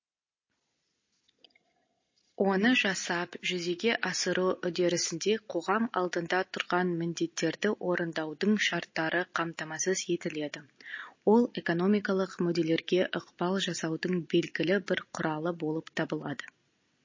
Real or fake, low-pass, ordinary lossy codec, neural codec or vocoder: real; 7.2 kHz; MP3, 32 kbps; none